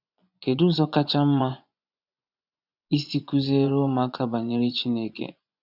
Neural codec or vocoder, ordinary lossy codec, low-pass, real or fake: vocoder, 24 kHz, 100 mel bands, Vocos; none; 5.4 kHz; fake